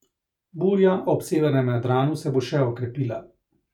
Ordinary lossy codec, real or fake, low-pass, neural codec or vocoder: none; fake; 19.8 kHz; vocoder, 48 kHz, 128 mel bands, Vocos